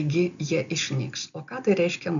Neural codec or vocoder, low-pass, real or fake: none; 7.2 kHz; real